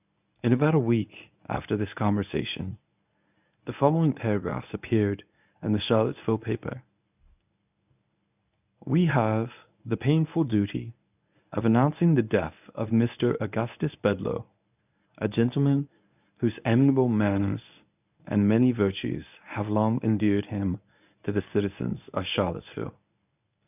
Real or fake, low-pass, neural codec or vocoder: fake; 3.6 kHz; codec, 24 kHz, 0.9 kbps, WavTokenizer, medium speech release version 1